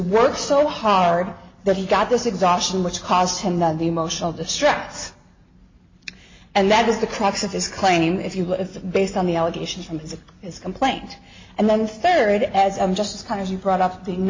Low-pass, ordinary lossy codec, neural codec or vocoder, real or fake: 7.2 kHz; MP3, 32 kbps; none; real